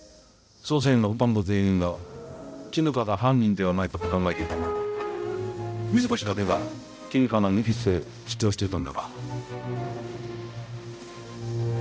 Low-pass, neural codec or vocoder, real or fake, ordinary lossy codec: none; codec, 16 kHz, 0.5 kbps, X-Codec, HuBERT features, trained on balanced general audio; fake; none